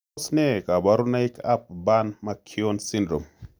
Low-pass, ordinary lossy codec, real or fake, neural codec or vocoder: none; none; real; none